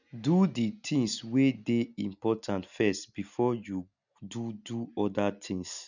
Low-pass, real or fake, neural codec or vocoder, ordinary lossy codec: 7.2 kHz; real; none; none